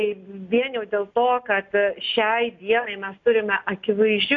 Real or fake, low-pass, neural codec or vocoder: real; 7.2 kHz; none